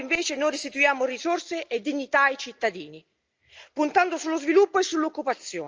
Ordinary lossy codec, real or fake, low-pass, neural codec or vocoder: Opus, 24 kbps; real; 7.2 kHz; none